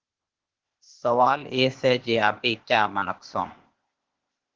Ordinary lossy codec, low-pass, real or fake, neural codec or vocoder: Opus, 16 kbps; 7.2 kHz; fake; codec, 16 kHz, 0.8 kbps, ZipCodec